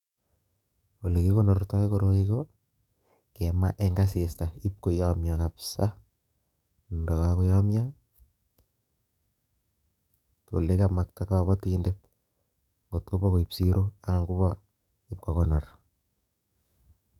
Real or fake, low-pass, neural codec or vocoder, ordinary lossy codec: fake; 19.8 kHz; codec, 44.1 kHz, 7.8 kbps, DAC; none